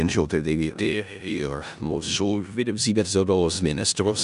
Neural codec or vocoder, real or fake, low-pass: codec, 16 kHz in and 24 kHz out, 0.4 kbps, LongCat-Audio-Codec, four codebook decoder; fake; 10.8 kHz